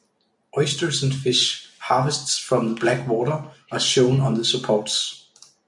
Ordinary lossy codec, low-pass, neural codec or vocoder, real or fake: MP3, 64 kbps; 10.8 kHz; none; real